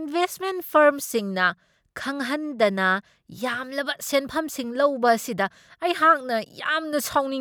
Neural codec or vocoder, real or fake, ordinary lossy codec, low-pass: none; real; none; none